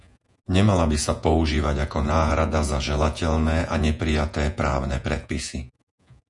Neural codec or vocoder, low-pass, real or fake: vocoder, 48 kHz, 128 mel bands, Vocos; 10.8 kHz; fake